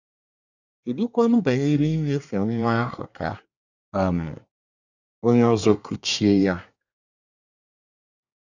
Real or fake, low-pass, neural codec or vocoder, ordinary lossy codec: fake; 7.2 kHz; codec, 24 kHz, 1 kbps, SNAC; none